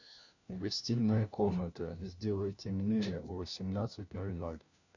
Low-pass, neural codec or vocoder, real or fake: 7.2 kHz; codec, 16 kHz, 1 kbps, FunCodec, trained on LibriTTS, 50 frames a second; fake